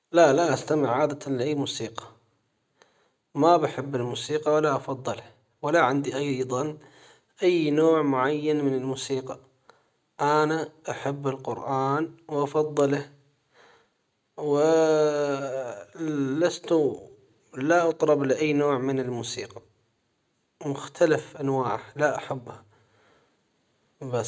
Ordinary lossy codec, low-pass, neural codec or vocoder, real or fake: none; none; none; real